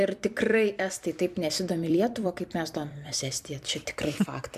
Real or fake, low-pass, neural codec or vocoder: fake; 14.4 kHz; vocoder, 44.1 kHz, 128 mel bands every 256 samples, BigVGAN v2